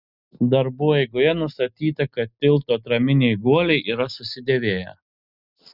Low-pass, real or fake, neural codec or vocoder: 5.4 kHz; real; none